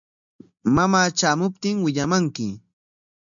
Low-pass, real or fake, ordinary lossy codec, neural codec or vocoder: 7.2 kHz; real; MP3, 64 kbps; none